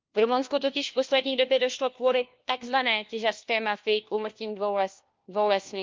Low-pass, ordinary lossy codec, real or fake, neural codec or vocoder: 7.2 kHz; Opus, 24 kbps; fake; codec, 16 kHz, 1 kbps, FunCodec, trained on LibriTTS, 50 frames a second